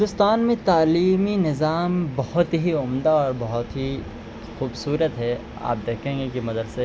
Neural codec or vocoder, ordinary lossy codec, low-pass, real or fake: none; none; none; real